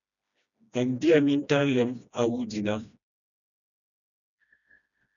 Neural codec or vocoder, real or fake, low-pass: codec, 16 kHz, 1 kbps, FreqCodec, smaller model; fake; 7.2 kHz